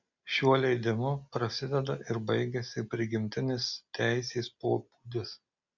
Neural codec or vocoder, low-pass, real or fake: none; 7.2 kHz; real